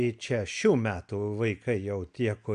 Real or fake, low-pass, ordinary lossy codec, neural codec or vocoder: real; 9.9 kHz; AAC, 96 kbps; none